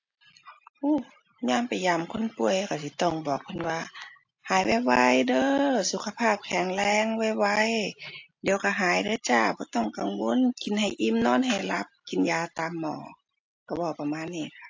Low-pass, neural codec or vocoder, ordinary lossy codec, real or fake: 7.2 kHz; none; AAC, 48 kbps; real